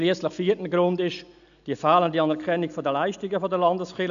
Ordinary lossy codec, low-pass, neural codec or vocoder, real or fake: none; 7.2 kHz; none; real